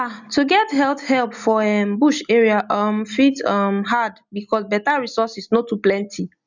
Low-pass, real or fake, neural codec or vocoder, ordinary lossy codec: 7.2 kHz; real; none; none